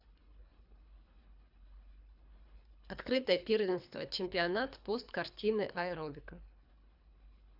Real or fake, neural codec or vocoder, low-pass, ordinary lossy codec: fake; codec, 24 kHz, 3 kbps, HILCodec; 5.4 kHz; none